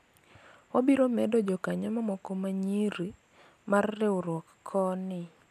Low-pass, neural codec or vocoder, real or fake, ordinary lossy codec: 14.4 kHz; none; real; none